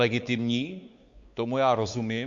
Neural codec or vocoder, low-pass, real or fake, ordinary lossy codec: codec, 16 kHz, 4 kbps, X-Codec, WavLM features, trained on Multilingual LibriSpeech; 7.2 kHz; fake; Opus, 64 kbps